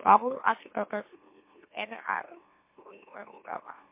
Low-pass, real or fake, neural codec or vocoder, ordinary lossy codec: 3.6 kHz; fake; autoencoder, 44.1 kHz, a latent of 192 numbers a frame, MeloTTS; MP3, 24 kbps